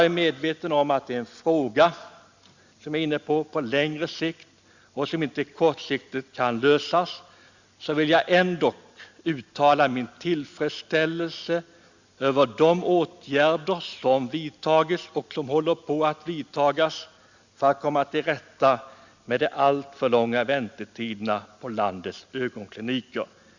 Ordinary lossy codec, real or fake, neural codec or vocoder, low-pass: Opus, 64 kbps; real; none; 7.2 kHz